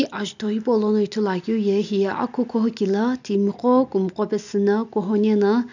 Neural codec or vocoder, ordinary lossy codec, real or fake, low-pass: none; none; real; 7.2 kHz